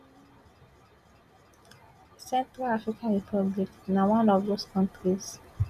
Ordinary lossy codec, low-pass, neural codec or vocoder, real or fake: none; 14.4 kHz; none; real